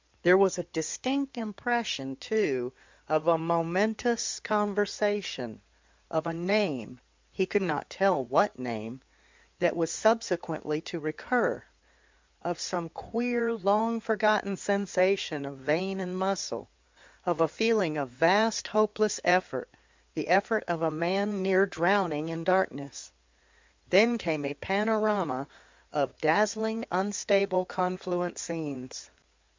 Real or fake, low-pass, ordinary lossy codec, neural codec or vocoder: fake; 7.2 kHz; MP3, 64 kbps; codec, 16 kHz in and 24 kHz out, 2.2 kbps, FireRedTTS-2 codec